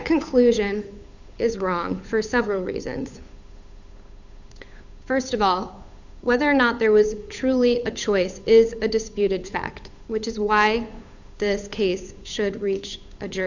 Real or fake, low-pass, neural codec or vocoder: fake; 7.2 kHz; codec, 16 kHz, 8 kbps, FunCodec, trained on Chinese and English, 25 frames a second